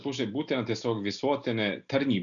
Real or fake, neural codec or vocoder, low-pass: real; none; 7.2 kHz